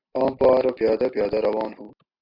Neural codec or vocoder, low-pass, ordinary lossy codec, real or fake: none; 5.4 kHz; MP3, 48 kbps; real